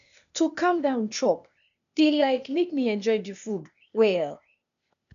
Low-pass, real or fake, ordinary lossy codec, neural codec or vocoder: 7.2 kHz; fake; none; codec, 16 kHz, 0.8 kbps, ZipCodec